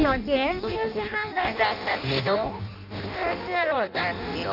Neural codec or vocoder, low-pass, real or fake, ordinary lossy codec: codec, 16 kHz in and 24 kHz out, 0.6 kbps, FireRedTTS-2 codec; 5.4 kHz; fake; none